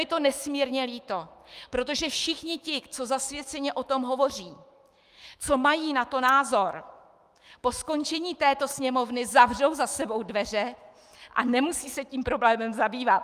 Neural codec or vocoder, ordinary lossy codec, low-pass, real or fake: autoencoder, 48 kHz, 128 numbers a frame, DAC-VAE, trained on Japanese speech; Opus, 32 kbps; 14.4 kHz; fake